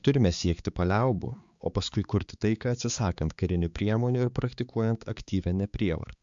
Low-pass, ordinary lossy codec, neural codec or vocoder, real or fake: 7.2 kHz; Opus, 64 kbps; codec, 16 kHz, 4 kbps, X-Codec, HuBERT features, trained on balanced general audio; fake